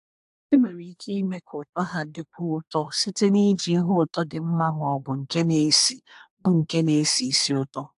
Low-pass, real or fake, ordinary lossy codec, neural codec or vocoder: 10.8 kHz; fake; AAC, 64 kbps; codec, 24 kHz, 1 kbps, SNAC